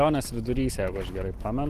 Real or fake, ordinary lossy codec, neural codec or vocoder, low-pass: real; Opus, 16 kbps; none; 14.4 kHz